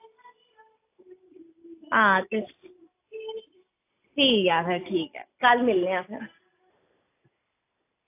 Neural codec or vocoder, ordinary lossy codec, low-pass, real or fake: none; AAC, 24 kbps; 3.6 kHz; real